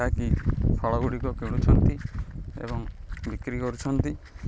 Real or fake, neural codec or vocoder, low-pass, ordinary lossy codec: real; none; none; none